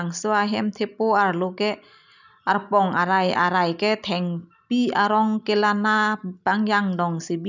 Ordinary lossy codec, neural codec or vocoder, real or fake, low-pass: none; none; real; 7.2 kHz